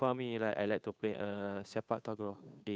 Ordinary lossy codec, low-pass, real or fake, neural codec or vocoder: none; none; fake; codec, 16 kHz, 2 kbps, FunCodec, trained on Chinese and English, 25 frames a second